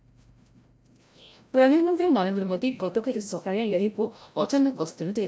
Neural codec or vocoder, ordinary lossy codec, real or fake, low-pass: codec, 16 kHz, 0.5 kbps, FreqCodec, larger model; none; fake; none